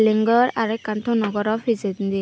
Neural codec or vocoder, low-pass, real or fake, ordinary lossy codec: none; none; real; none